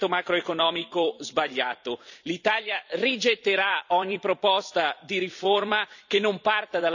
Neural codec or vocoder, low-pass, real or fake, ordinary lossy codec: vocoder, 44.1 kHz, 128 mel bands every 256 samples, BigVGAN v2; 7.2 kHz; fake; none